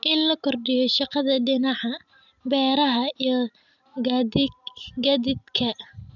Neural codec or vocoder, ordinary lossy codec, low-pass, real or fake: none; none; 7.2 kHz; real